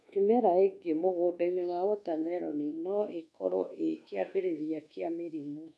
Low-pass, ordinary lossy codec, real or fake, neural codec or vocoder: none; none; fake; codec, 24 kHz, 1.2 kbps, DualCodec